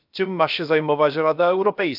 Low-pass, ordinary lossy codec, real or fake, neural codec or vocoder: 5.4 kHz; none; fake; codec, 16 kHz, about 1 kbps, DyCAST, with the encoder's durations